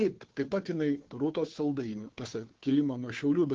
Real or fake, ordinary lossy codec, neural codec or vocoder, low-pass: fake; Opus, 16 kbps; codec, 16 kHz, 2 kbps, FunCodec, trained on Chinese and English, 25 frames a second; 7.2 kHz